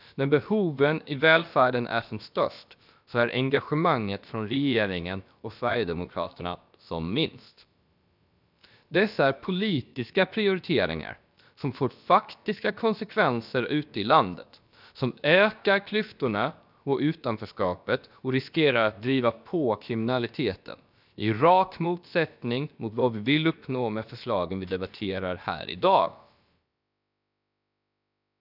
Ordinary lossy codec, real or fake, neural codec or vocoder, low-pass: none; fake; codec, 16 kHz, about 1 kbps, DyCAST, with the encoder's durations; 5.4 kHz